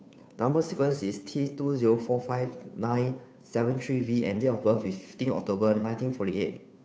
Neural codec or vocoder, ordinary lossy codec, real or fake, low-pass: codec, 16 kHz, 2 kbps, FunCodec, trained on Chinese and English, 25 frames a second; none; fake; none